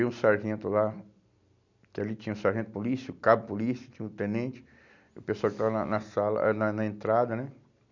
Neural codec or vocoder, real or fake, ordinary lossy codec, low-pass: none; real; none; 7.2 kHz